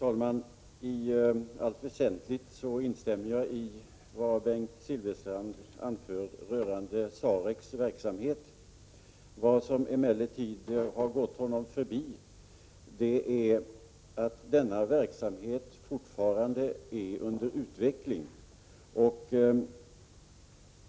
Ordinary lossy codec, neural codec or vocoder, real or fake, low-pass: none; none; real; none